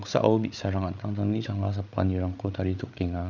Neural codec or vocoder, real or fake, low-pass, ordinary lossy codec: codec, 16 kHz, 8 kbps, FreqCodec, larger model; fake; 7.2 kHz; none